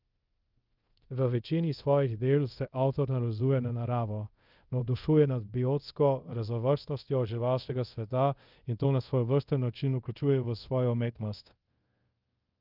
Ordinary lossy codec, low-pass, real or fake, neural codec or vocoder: Opus, 32 kbps; 5.4 kHz; fake; codec, 24 kHz, 0.5 kbps, DualCodec